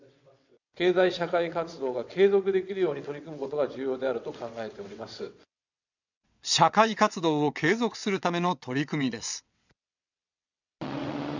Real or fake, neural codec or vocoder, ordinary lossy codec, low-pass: fake; vocoder, 22.05 kHz, 80 mel bands, WaveNeXt; none; 7.2 kHz